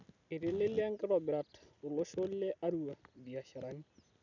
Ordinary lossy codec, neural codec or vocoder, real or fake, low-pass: none; none; real; 7.2 kHz